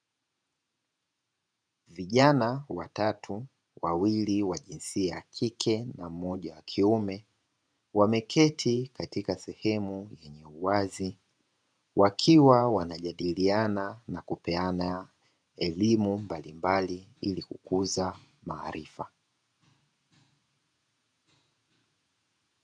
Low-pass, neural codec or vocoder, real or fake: 9.9 kHz; vocoder, 48 kHz, 128 mel bands, Vocos; fake